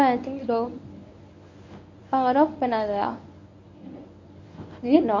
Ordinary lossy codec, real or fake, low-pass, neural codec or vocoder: MP3, 48 kbps; fake; 7.2 kHz; codec, 24 kHz, 0.9 kbps, WavTokenizer, medium speech release version 1